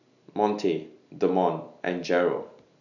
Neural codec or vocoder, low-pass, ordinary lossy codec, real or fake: none; 7.2 kHz; none; real